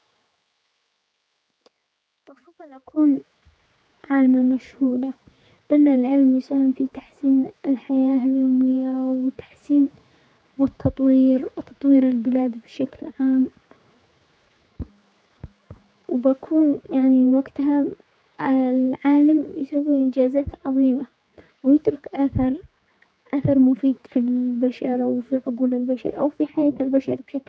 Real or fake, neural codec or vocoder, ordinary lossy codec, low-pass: fake; codec, 16 kHz, 4 kbps, X-Codec, HuBERT features, trained on general audio; none; none